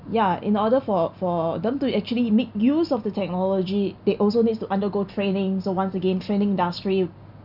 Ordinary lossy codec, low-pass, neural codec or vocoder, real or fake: none; 5.4 kHz; none; real